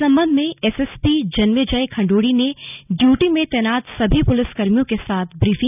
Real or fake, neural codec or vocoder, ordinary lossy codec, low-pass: real; none; none; 3.6 kHz